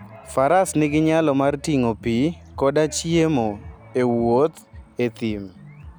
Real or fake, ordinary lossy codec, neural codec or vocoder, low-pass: real; none; none; none